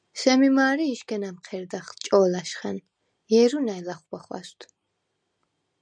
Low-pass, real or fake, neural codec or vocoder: 9.9 kHz; real; none